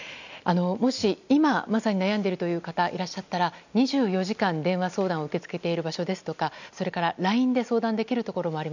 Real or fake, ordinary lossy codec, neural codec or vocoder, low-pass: real; none; none; 7.2 kHz